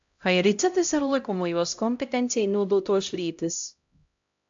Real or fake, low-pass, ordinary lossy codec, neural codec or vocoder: fake; 7.2 kHz; AAC, 64 kbps; codec, 16 kHz, 0.5 kbps, X-Codec, HuBERT features, trained on LibriSpeech